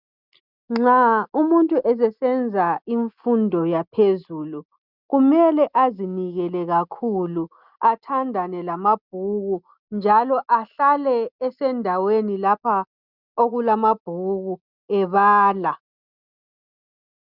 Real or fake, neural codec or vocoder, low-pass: real; none; 5.4 kHz